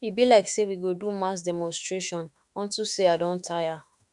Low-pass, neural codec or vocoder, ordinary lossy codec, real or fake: 10.8 kHz; autoencoder, 48 kHz, 32 numbers a frame, DAC-VAE, trained on Japanese speech; none; fake